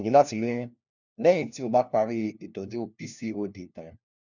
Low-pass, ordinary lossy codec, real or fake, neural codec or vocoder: 7.2 kHz; none; fake; codec, 16 kHz, 1 kbps, FunCodec, trained on LibriTTS, 50 frames a second